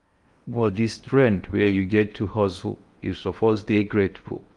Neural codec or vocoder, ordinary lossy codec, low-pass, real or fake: codec, 16 kHz in and 24 kHz out, 0.8 kbps, FocalCodec, streaming, 65536 codes; Opus, 32 kbps; 10.8 kHz; fake